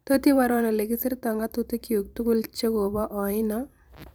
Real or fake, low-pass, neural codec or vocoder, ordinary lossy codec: fake; none; vocoder, 44.1 kHz, 128 mel bands every 512 samples, BigVGAN v2; none